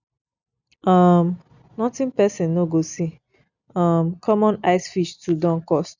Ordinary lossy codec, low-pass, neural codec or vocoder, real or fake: none; 7.2 kHz; none; real